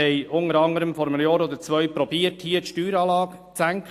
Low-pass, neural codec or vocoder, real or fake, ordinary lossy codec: 14.4 kHz; none; real; AAC, 64 kbps